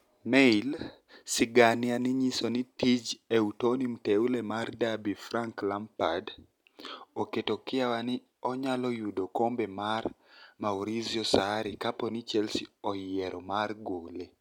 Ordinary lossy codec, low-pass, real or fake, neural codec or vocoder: none; 19.8 kHz; real; none